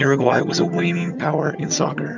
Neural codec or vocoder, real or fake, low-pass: vocoder, 22.05 kHz, 80 mel bands, HiFi-GAN; fake; 7.2 kHz